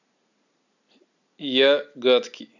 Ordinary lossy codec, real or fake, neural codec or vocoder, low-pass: none; real; none; 7.2 kHz